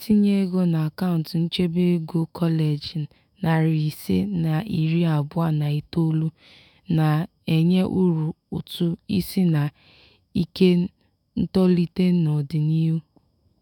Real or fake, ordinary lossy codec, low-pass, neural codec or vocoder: fake; none; 19.8 kHz; autoencoder, 48 kHz, 128 numbers a frame, DAC-VAE, trained on Japanese speech